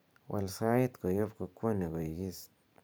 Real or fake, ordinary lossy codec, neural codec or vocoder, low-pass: fake; none; vocoder, 44.1 kHz, 128 mel bands every 512 samples, BigVGAN v2; none